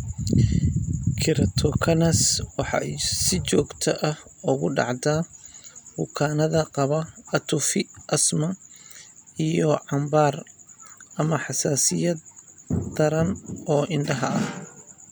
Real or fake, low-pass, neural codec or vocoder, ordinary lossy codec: real; none; none; none